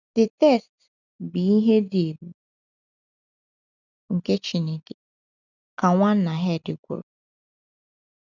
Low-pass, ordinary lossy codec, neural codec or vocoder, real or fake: 7.2 kHz; none; none; real